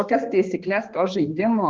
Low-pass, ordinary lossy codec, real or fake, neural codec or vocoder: 7.2 kHz; Opus, 16 kbps; fake; codec, 16 kHz, 2 kbps, X-Codec, HuBERT features, trained on balanced general audio